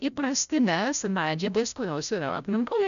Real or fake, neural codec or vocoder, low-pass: fake; codec, 16 kHz, 0.5 kbps, FreqCodec, larger model; 7.2 kHz